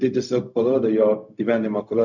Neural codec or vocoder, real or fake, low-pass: codec, 16 kHz, 0.4 kbps, LongCat-Audio-Codec; fake; 7.2 kHz